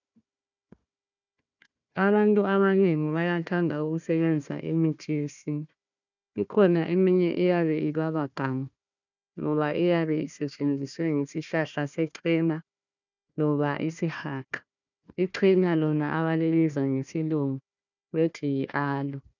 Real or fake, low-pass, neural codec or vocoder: fake; 7.2 kHz; codec, 16 kHz, 1 kbps, FunCodec, trained on Chinese and English, 50 frames a second